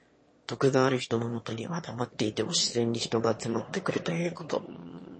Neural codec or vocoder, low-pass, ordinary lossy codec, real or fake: autoencoder, 22.05 kHz, a latent of 192 numbers a frame, VITS, trained on one speaker; 9.9 kHz; MP3, 32 kbps; fake